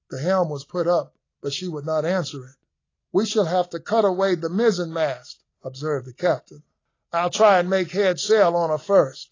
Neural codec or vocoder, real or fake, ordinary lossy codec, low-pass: none; real; AAC, 32 kbps; 7.2 kHz